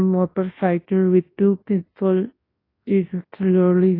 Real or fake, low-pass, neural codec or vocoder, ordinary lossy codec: fake; 5.4 kHz; codec, 24 kHz, 0.9 kbps, WavTokenizer, large speech release; AAC, 24 kbps